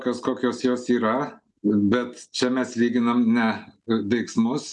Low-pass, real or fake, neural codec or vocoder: 10.8 kHz; real; none